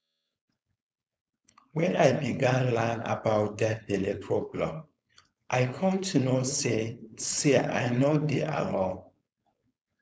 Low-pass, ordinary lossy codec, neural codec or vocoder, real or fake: none; none; codec, 16 kHz, 4.8 kbps, FACodec; fake